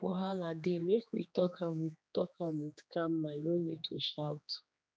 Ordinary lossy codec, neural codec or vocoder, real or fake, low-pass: none; codec, 16 kHz, 2 kbps, X-Codec, HuBERT features, trained on general audio; fake; none